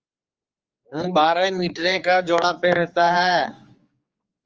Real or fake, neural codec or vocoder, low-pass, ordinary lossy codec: fake; codec, 16 kHz, 4 kbps, X-Codec, HuBERT features, trained on general audio; 7.2 kHz; Opus, 24 kbps